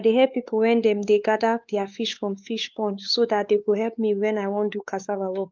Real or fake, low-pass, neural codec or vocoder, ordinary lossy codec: fake; 7.2 kHz; codec, 16 kHz, 4 kbps, X-Codec, WavLM features, trained on Multilingual LibriSpeech; Opus, 32 kbps